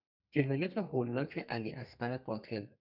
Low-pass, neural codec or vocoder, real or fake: 5.4 kHz; codec, 32 kHz, 1.9 kbps, SNAC; fake